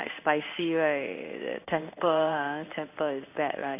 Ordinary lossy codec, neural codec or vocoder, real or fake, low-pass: none; codec, 16 kHz in and 24 kHz out, 1 kbps, XY-Tokenizer; fake; 3.6 kHz